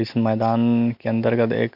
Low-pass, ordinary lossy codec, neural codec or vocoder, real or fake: 5.4 kHz; none; none; real